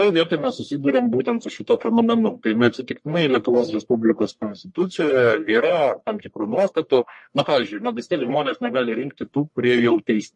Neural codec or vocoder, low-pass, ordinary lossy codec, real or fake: codec, 44.1 kHz, 1.7 kbps, Pupu-Codec; 10.8 kHz; MP3, 48 kbps; fake